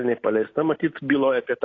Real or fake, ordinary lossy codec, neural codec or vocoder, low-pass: real; AAC, 32 kbps; none; 7.2 kHz